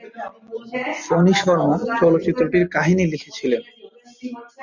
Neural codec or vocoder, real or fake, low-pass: none; real; 7.2 kHz